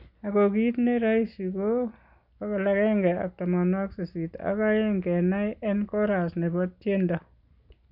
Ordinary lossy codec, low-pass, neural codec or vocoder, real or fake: none; 5.4 kHz; none; real